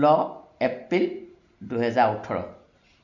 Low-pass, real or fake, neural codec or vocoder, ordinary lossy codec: 7.2 kHz; real; none; none